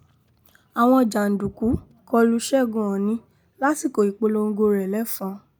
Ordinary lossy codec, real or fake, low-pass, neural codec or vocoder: none; real; none; none